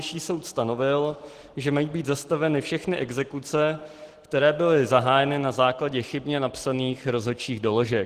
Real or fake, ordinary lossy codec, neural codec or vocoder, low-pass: real; Opus, 16 kbps; none; 14.4 kHz